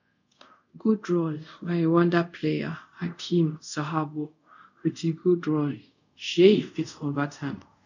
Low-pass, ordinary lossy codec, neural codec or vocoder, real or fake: 7.2 kHz; none; codec, 24 kHz, 0.5 kbps, DualCodec; fake